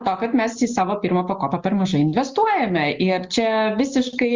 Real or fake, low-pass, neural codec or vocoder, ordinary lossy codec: real; 7.2 kHz; none; Opus, 32 kbps